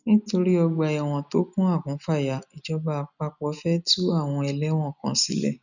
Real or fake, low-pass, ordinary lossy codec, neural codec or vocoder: real; 7.2 kHz; none; none